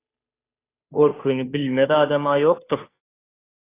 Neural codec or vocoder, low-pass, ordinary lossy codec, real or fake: codec, 16 kHz, 2 kbps, FunCodec, trained on Chinese and English, 25 frames a second; 3.6 kHz; AAC, 24 kbps; fake